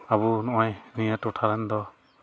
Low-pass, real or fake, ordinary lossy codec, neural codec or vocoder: none; real; none; none